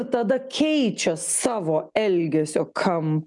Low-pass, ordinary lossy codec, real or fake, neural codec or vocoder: 10.8 kHz; MP3, 96 kbps; real; none